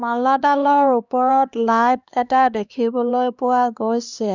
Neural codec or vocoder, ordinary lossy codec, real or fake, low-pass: codec, 16 kHz, 2 kbps, X-Codec, HuBERT features, trained on LibriSpeech; none; fake; 7.2 kHz